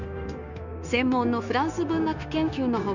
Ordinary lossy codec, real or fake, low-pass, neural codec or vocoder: none; fake; 7.2 kHz; codec, 16 kHz, 0.9 kbps, LongCat-Audio-Codec